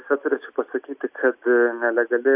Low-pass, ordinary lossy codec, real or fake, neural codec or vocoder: 3.6 kHz; AAC, 32 kbps; real; none